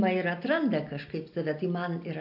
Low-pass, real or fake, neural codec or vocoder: 5.4 kHz; real; none